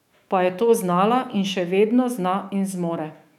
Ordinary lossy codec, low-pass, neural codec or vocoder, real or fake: none; 19.8 kHz; autoencoder, 48 kHz, 128 numbers a frame, DAC-VAE, trained on Japanese speech; fake